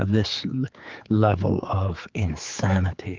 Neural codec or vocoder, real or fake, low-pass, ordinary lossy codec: codec, 16 kHz, 4 kbps, X-Codec, HuBERT features, trained on general audio; fake; 7.2 kHz; Opus, 32 kbps